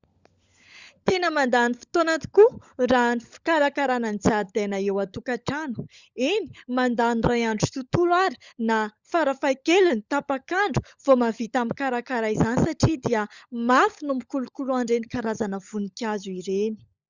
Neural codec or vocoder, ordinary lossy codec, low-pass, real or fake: codec, 16 kHz, 16 kbps, FunCodec, trained on LibriTTS, 50 frames a second; Opus, 64 kbps; 7.2 kHz; fake